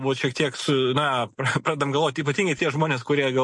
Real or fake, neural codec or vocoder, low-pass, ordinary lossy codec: real; none; 10.8 kHz; MP3, 48 kbps